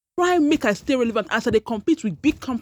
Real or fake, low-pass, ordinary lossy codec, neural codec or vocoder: real; 19.8 kHz; none; none